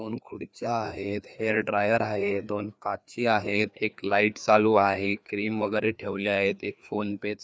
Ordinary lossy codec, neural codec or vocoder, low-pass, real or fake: none; codec, 16 kHz, 2 kbps, FreqCodec, larger model; none; fake